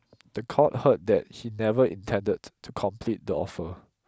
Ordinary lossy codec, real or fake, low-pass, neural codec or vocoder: none; real; none; none